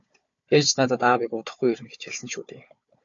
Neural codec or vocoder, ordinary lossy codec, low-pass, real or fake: codec, 16 kHz, 4 kbps, FunCodec, trained on Chinese and English, 50 frames a second; MP3, 48 kbps; 7.2 kHz; fake